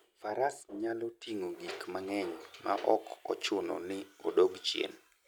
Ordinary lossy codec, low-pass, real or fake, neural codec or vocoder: none; none; real; none